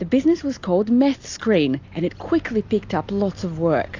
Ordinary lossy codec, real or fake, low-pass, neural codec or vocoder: AAC, 48 kbps; real; 7.2 kHz; none